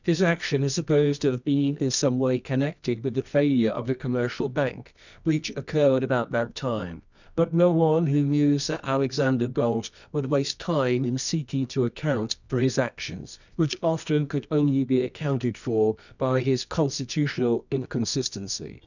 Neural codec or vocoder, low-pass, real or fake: codec, 24 kHz, 0.9 kbps, WavTokenizer, medium music audio release; 7.2 kHz; fake